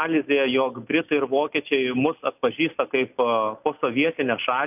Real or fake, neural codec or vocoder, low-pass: real; none; 3.6 kHz